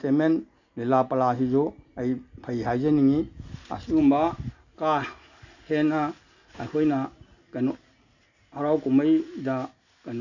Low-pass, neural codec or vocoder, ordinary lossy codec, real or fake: 7.2 kHz; none; none; real